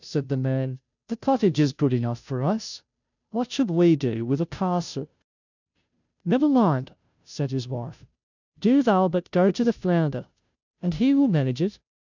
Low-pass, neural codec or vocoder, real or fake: 7.2 kHz; codec, 16 kHz, 0.5 kbps, FunCodec, trained on Chinese and English, 25 frames a second; fake